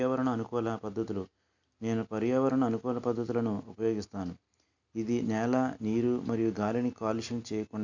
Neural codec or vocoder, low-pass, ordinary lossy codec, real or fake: none; 7.2 kHz; none; real